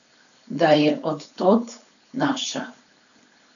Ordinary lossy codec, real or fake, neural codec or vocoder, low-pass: none; fake; codec, 16 kHz, 4.8 kbps, FACodec; 7.2 kHz